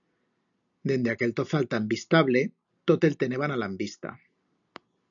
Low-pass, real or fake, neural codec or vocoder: 7.2 kHz; real; none